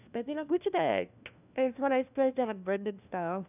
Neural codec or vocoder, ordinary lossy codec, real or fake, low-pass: codec, 16 kHz, 1 kbps, FunCodec, trained on LibriTTS, 50 frames a second; none; fake; 3.6 kHz